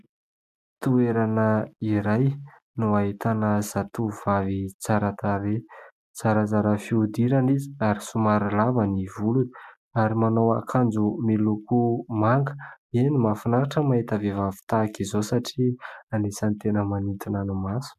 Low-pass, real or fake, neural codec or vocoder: 14.4 kHz; real; none